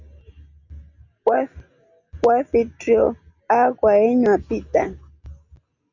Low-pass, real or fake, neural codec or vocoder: 7.2 kHz; real; none